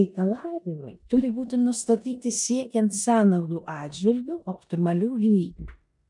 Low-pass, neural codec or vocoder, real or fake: 10.8 kHz; codec, 16 kHz in and 24 kHz out, 0.9 kbps, LongCat-Audio-Codec, four codebook decoder; fake